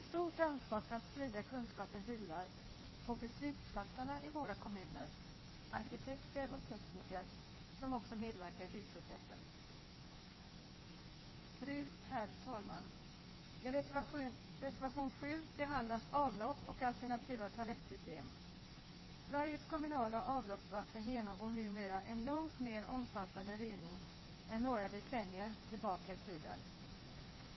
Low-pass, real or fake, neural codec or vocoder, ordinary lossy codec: 7.2 kHz; fake; codec, 16 kHz in and 24 kHz out, 1.1 kbps, FireRedTTS-2 codec; MP3, 24 kbps